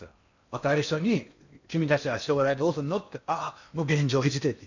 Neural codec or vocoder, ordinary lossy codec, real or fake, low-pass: codec, 16 kHz in and 24 kHz out, 0.8 kbps, FocalCodec, streaming, 65536 codes; none; fake; 7.2 kHz